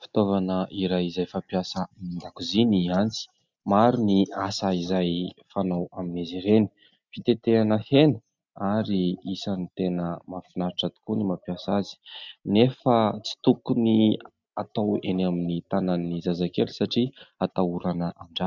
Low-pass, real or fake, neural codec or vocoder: 7.2 kHz; real; none